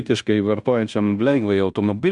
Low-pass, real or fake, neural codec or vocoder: 10.8 kHz; fake; codec, 16 kHz in and 24 kHz out, 0.9 kbps, LongCat-Audio-Codec, fine tuned four codebook decoder